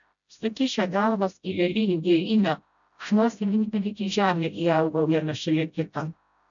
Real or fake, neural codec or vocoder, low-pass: fake; codec, 16 kHz, 0.5 kbps, FreqCodec, smaller model; 7.2 kHz